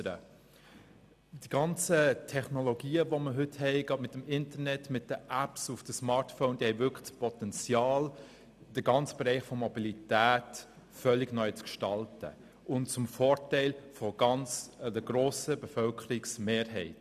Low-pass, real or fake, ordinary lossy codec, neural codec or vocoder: 14.4 kHz; real; none; none